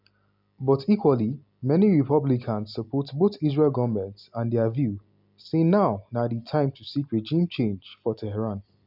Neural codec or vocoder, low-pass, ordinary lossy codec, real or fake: none; 5.4 kHz; none; real